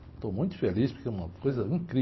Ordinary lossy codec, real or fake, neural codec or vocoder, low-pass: MP3, 24 kbps; real; none; 7.2 kHz